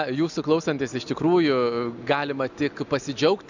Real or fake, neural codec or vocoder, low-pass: real; none; 7.2 kHz